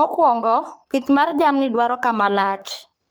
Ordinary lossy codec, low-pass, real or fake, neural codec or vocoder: none; none; fake; codec, 44.1 kHz, 3.4 kbps, Pupu-Codec